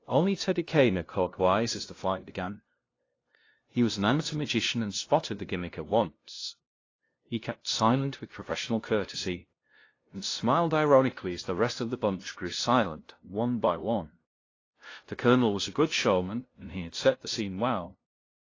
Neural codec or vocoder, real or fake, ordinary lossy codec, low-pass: codec, 16 kHz, 0.5 kbps, FunCodec, trained on LibriTTS, 25 frames a second; fake; AAC, 32 kbps; 7.2 kHz